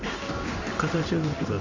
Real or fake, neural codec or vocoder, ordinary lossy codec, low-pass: fake; codec, 16 kHz in and 24 kHz out, 1 kbps, XY-Tokenizer; AAC, 48 kbps; 7.2 kHz